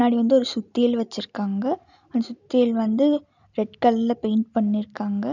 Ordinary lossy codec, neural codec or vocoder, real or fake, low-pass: none; none; real; 7.2 kHz